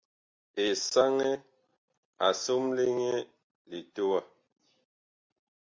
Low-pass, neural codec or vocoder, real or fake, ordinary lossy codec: 7.2 kHz; none; real; MP3, 48 kbps